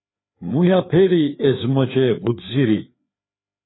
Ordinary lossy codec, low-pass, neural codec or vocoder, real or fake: AAC, 16 kbps; 7.2 kHz; codec, 16 kHz, 4 kbps, FreqCodec, larger model; fake